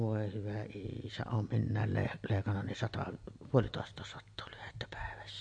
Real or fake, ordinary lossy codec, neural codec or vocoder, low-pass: real; MP3, 48 kbps; none; 9.9 kHz